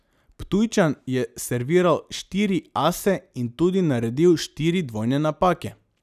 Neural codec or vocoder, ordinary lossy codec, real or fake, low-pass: none; none; real; 14.4 kHz